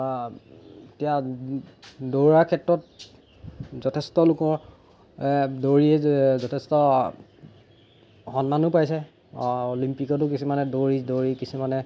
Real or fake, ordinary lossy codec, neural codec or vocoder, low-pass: real; none; none; none